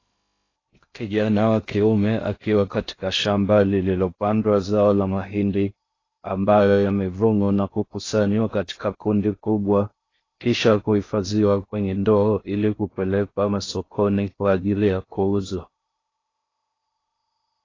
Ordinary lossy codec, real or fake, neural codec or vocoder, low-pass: AAC, 32 kbps; fake; codec, 16 kHz in and 24 kHz out, 0.6 kbps, FocalCodec, streaming, 2048 codes; 7.2 kHz